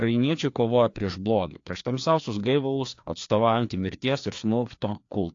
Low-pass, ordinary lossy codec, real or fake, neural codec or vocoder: 7.2 kHz; AAC, 48 kbps; fake; codec, 16 kHz, 2 kbps, FreqCodec, larger model